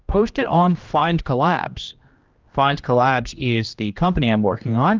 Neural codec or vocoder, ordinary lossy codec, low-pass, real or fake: codec, 16 kHz, 1 kbps, X-Codec, HuBERT features, trained on general audio; Opus, 24 kbps; 7.2 kHz; fake